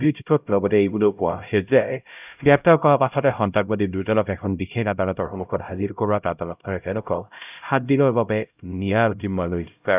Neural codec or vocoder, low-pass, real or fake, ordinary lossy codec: codec, 16 kHz, 0.5 kbps, X-Codec, HuBERT features, trained on LibriSpeech; 3.6 kHz; fake; none